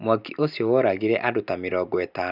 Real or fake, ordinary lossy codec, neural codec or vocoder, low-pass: real; none; none; 5.4 kHz